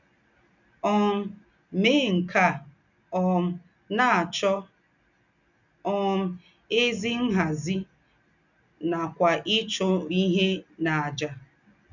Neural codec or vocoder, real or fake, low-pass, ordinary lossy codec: none; real; 7.2 kHz; none